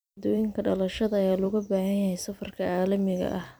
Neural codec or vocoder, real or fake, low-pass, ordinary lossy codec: vocoder, 44.1 kHz, 128 mel bands every 256 samples, BigVGAN v2; fake; none; none